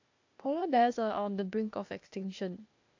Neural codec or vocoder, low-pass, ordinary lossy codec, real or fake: codec, 16 kHz, 0.8 kbps, ZipCodec; 7.2 kHz; AAC, 48 kbps; fake